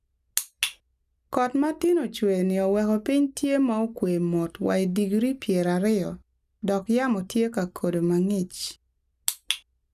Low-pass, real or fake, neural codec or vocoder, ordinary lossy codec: 14.4 kHz; real; none; none